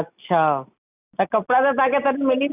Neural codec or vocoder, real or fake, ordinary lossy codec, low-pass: none; real; none; 3.6 kHz